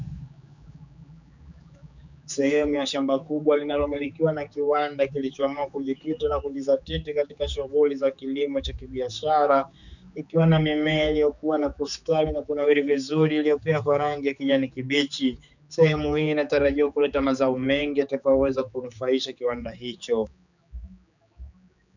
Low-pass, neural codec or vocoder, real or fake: 7.2 kHz; codec, 16 kHz, 4 kbps, X-Codec, HuBERT features, trained on general audio; fake